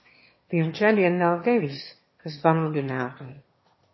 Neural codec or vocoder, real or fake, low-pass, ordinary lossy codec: autoencoder, 22.05 kHz, a latent of 192 numbers a frame, VITS, trained on one speaker; fake; 7.2 kHz; MP3, 24 kbps